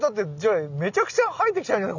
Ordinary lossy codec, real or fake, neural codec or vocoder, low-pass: none; real; none; 7.2 kHz